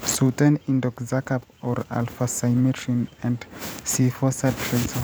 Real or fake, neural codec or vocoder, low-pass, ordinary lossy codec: fake; vocoder, 44.1 kHz, 128 mel bands every 512 samples, BigVGAN v2; none; none